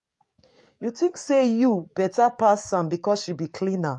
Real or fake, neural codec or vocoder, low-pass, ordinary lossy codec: fake; codec, 44.1 kHz, 7.8 kbps, DAC; 10.8 kHz; MP3, 48 kbps